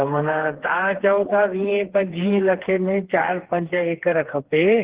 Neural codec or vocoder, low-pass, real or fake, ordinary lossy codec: codec, 16 kHz, 4 kbps, FreqCodec, smaller model; 3.6 kHz; fake; Opus, 16 kbps